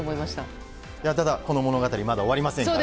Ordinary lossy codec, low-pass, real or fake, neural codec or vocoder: none; none; real; none